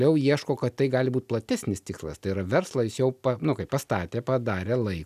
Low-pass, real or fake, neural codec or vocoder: 14.4 kHz; real; none